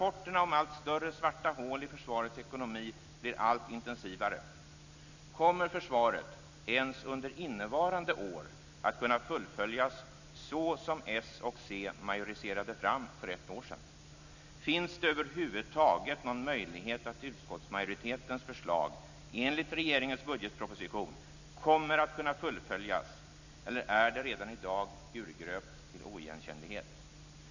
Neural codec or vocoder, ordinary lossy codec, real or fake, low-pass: none; none; real; 7.2 kHz